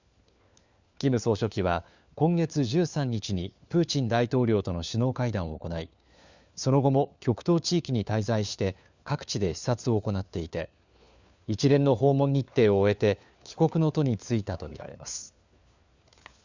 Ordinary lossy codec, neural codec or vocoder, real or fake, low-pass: Opus, 64 kbps; codec, 16 kHz, 4 kbps, FunCodec, trained on LibriTTS, 50 frames a second; fake; 7.2 kHz